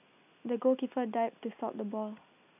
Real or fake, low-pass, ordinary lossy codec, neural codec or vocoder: real; 3.6 kHz; none; none